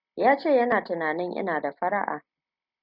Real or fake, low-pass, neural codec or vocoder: real; 5.4 kHz; none